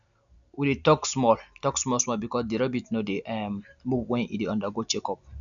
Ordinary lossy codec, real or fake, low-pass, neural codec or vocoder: none; real; 7.2 kHz; none